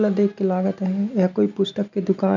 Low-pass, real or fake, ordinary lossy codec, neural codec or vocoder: 7.2 kHz; real; none; none